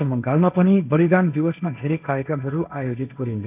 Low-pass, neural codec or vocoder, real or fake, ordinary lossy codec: 3.6 kHz; codec, 16 kHz, 1.1 kbps, Voila-Tokenizer; fake; AAC, 32 kbps